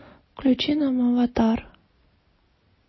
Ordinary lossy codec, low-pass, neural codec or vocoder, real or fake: MP3, 24 kbps; 7.2 kHz; none; real